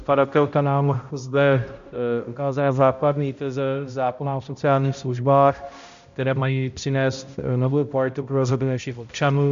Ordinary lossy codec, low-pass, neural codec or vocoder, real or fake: MP3, 64 kbps; 7.2 kHz; codec, 16 kHz, 0.5 kbps, X-Codec, HuBERT features, trained on balanced general audio; fake